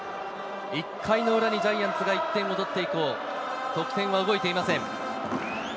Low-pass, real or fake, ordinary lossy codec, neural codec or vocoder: none; real; none; none